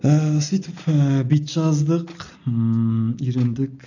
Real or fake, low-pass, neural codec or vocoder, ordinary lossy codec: real; 7.2 kHz; none; none